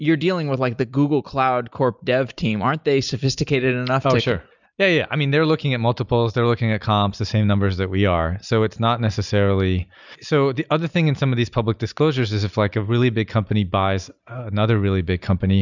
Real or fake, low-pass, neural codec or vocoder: real; 7.2 kHz; none